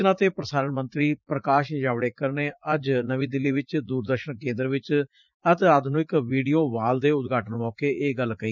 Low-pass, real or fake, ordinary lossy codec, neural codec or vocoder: 7.2 kHz; fake; none; vocoder, 44.1 kHz, 80 mel bands, Vocos